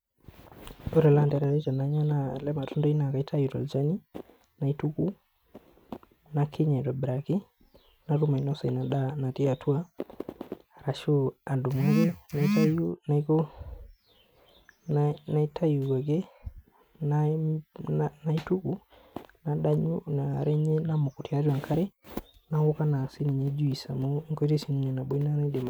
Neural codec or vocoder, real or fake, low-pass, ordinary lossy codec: none; real; none; none